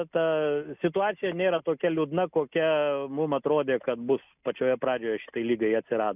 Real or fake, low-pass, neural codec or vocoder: real; 3.6 kHz; none